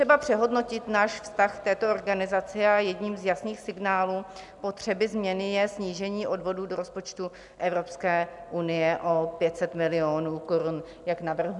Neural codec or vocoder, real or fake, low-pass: none; real; 10.8 kHz